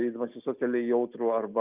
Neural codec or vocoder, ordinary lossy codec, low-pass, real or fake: none; Opus, 24 kbps; 3.6 kHz; real